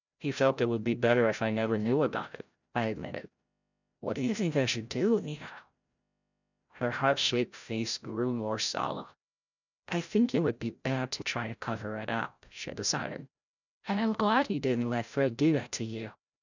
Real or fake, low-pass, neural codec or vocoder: fake; 7.2 kHz; codec, 16 kHz, 0.5 kbps, FreqCodec, larger model